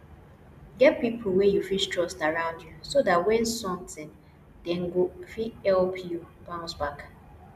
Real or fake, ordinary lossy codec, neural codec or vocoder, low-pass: real; none; none; 14.4 kHz